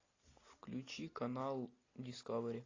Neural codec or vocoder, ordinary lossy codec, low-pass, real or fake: none; AAC, 32 kbps; 7.2 kHz; real